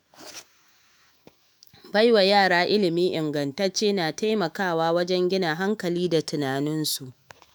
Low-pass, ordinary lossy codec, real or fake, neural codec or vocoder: none; none; fake; autoencoder, 48 kHz, 128 numbers a frame, DAC-VAE, trained on Japanese speech